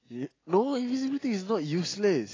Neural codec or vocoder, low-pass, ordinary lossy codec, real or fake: none; 7.2 kHz; AAC, 32 kbps; real